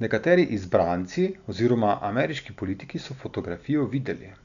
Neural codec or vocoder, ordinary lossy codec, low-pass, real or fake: none; none; 7.2 kHz; real